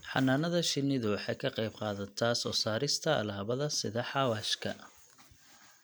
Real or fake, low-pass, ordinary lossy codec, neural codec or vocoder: real; none; none; none